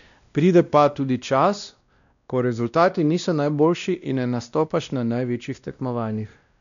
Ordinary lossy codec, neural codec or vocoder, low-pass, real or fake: none; codec, 16 kHz, 1 kbps, X-Codec, WavLM features, trained on Multilingual LibriSpeech; 7.2 kHz; fake